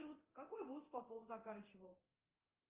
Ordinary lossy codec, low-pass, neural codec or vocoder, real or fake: Opus, 24 kbps; 3.6 kHz; codec, 24 kHz, 0.9 kbps, DualCodec; fake